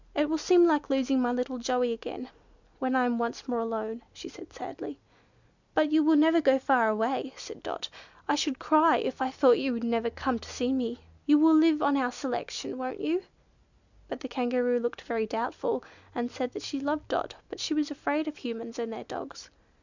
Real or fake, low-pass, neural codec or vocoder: real; 7.2 kHz; none